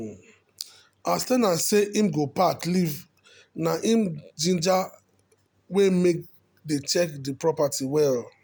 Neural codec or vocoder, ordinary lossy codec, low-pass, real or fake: none; none; none; real